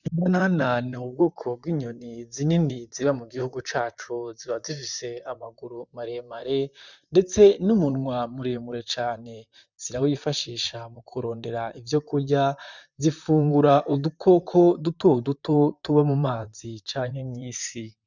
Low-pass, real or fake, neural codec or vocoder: 7.2 kHz; fake; vocoder, 44.1 kHz, 128 mel bands, Pupu-Vocoder